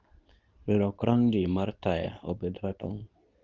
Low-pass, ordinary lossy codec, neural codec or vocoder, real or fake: 7.2 kHz; Opus, 24 kbps; codec, 24 kHz, 0.9 kbps, WavTokenizer, medium speech release version 2; fake